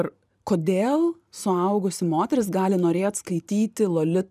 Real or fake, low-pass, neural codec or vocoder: fake; 14.4 kHz; vocoder, 44.1 kHz, 128 mel bands every 512 samples, BigVGAN v2